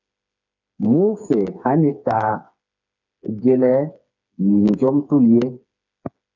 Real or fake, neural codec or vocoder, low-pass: fake; codec, 16 kHz, 4 kbps, FreqCodec, smaller model; 7.2 kHz